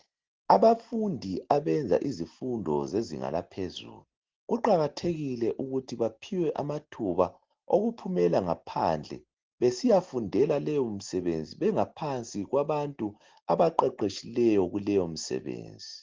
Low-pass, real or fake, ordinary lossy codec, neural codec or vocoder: 7.2 kHz; real; Opus, 16 kbps; none